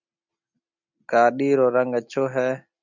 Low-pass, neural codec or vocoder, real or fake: 7.2 kHz; none; real